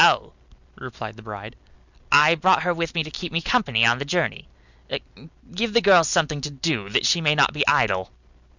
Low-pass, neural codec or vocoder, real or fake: 7.2 kHz; none; real